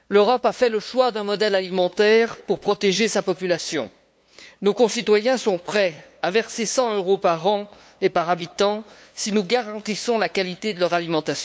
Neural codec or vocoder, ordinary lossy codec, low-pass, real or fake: codec, 16 kHz, 2 kbps, FunCodec, trained on LibriTTS, 25 frames a second; none; none; fake